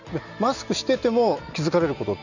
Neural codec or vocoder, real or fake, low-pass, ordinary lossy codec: none; real; 7.2 kHz; none